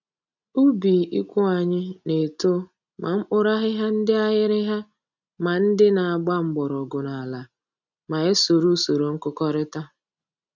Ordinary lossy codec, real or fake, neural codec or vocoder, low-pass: none; real; none; 7.2 kHz